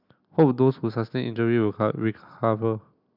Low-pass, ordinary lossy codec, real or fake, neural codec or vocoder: 5.4 kHz; none; real; none